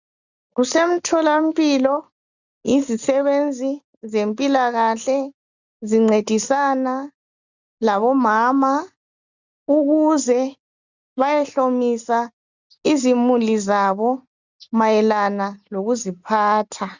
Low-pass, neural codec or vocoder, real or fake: 7.2 kHz; none; real